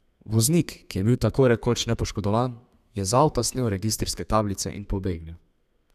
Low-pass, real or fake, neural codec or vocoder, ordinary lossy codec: 14.4 kHz; fake; codec, 32 kHz, 1.9 kbps, SNAC; none